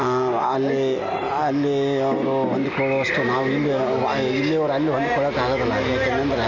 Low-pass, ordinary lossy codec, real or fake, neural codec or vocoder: 7.2 kHz; none; real; none